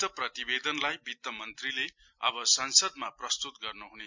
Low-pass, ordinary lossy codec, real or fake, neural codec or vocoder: 7.2 kHz; MP3, 64 kbps; real; none